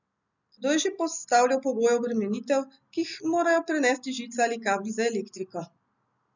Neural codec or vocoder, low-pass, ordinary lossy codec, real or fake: none; 7.2 kHz; none; real